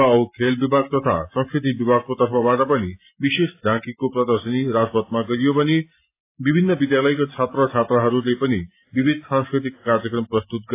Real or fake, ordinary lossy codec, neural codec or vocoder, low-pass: real; AAC, 24 kbps; none; 3.6 kHz